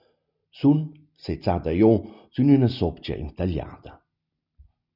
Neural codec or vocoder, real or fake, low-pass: none; real; 5.4 kHz